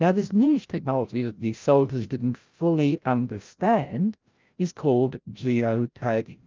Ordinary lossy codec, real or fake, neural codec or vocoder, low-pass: Opus, 24 kbps; fake; codec, 16 kHz, 0.5 kbps, FreqCodec, larger model; 7.2 kHz